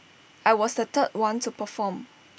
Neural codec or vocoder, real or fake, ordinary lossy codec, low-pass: none; real; none; none